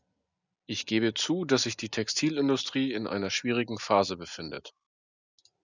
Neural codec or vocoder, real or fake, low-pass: none; real; 7.2 kHz